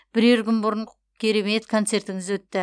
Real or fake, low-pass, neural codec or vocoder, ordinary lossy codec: real; 9.9 kHz; none; none